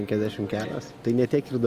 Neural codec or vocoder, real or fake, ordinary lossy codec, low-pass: none; real; Opus, 32 kbps; 14.4 kHz